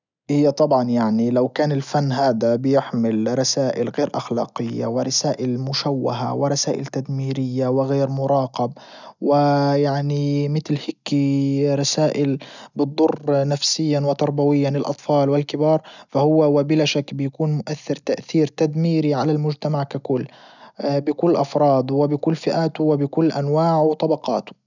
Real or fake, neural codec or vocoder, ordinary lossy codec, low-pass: real; none; none; 7.2 kHz